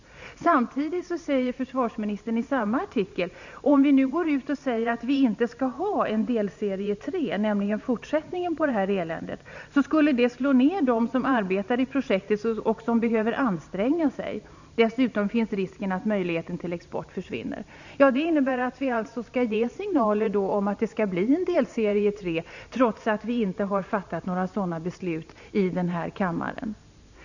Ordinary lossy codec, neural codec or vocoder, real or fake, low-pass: none; vocoder, 44.1 kHz, 128 mel bands every 512 samples, BigVGAN v2; fake; 7.2 kHz